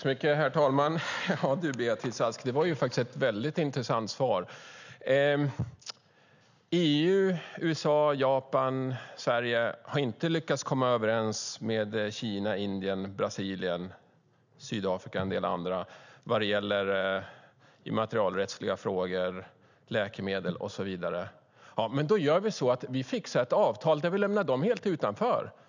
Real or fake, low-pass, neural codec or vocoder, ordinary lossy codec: real; 7.2 kHz; none; none